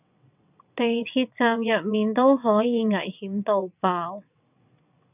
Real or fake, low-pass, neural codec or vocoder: fake; 3.6 kHz; vocoder, 22.05 kHz, 80 mel bands, WaveNeXt